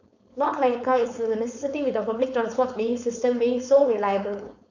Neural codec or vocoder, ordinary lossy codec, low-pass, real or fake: codec, 16 kHz, 4.8 kbps, FACodec; none; 7.2 kHz; fake